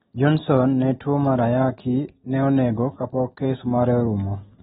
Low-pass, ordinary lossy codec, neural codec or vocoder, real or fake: 19.8 kHz; AAC, 16 kbps; none; real